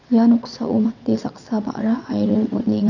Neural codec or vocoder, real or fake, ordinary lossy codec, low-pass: vocoder, 22.05 kHz, 80 mel bands, WaveNeXt; fake; none; 7.2 kHz